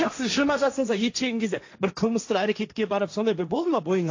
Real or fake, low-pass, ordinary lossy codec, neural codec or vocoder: fake; 7.2 kHz; AAC, 48 kbps; codec, 16 kHz, 1.1 kbps, Voila-Tokenizer